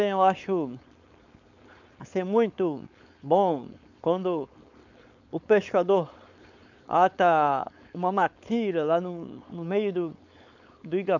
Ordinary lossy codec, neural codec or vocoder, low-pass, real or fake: none; codec, 16 kHz, 4.8 kbps, FACodec; 7.2 kHz; fake